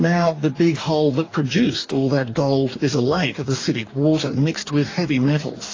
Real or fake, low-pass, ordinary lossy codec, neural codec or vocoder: fake; 7.2 kHz; AAC, 32 kbps; codec, 44.1 kHz, 2.6 kbps, DAC